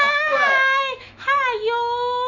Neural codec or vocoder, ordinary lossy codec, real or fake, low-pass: autoencoder, 48 kHz, 128 numbers a frame, DAC-VAE, trained on Japanese speech; none; fake; 7.2 kHz